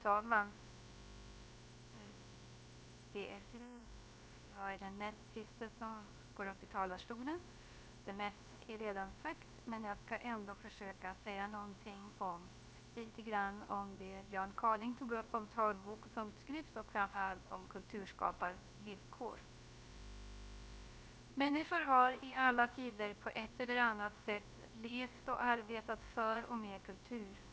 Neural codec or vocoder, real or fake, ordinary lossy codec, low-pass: codec, 16 kHz, about 1 kbps, DyCAST, with the encoder's durations; fake; none; none